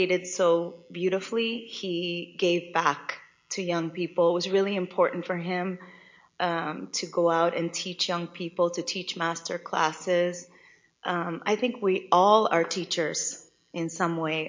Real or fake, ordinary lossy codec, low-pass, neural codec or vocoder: real; MP3, 48 kbps; 7.2 kHz; none